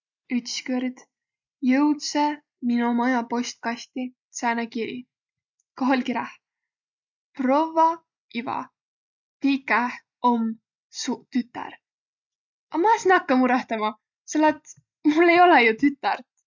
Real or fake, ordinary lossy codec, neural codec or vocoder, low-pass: real; none; none; 7.2 kHz